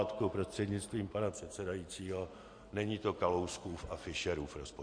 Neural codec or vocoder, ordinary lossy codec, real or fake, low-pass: none; MP3, 48 kbps; real; 9.9 kHz